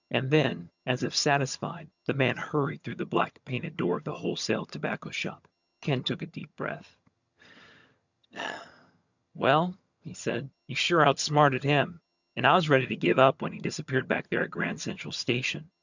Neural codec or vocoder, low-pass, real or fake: vocoder, 22.05 kHz, 80 mel bands, HiFi-GAN; 7.2 kHz; fake